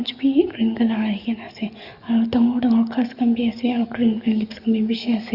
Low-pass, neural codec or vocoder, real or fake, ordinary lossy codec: 5.4 kHz; codec, 44.1 kHz, 7.8 kbps, DAC; fake; Opus, 64 kbps